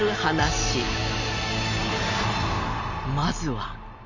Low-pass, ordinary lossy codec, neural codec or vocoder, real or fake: 7.2 kHz; none; none; real